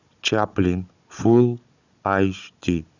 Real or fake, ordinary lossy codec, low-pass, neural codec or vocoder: fake; Opus, 64 kbps; 7.2 kHz; codec, 16 kHz, 16 kbps, FunCodec, trained on Chinese and English, 50 frames a second